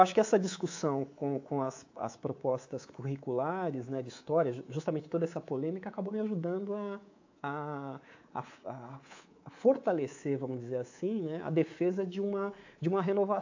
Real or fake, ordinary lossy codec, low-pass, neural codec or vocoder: fake; AAC, 48 kbps; 7.2 kHz; codec, 24 kHz, 3.1 kbps, DualCodec